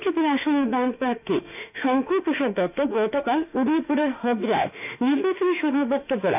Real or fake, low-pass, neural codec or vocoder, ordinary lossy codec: fake; 3.6 kHz; autoencoder, 48 kHz, 32 numbers a frame, DAC-VAE, trained on Japanese speech; none